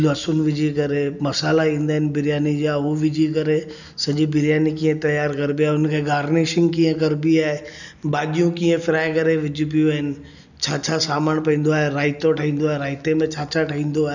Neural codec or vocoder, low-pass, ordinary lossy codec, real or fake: none; 7.2 kHz; none; real